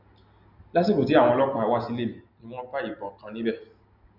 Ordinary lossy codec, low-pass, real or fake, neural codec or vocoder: none; 5.4 kHz; real; none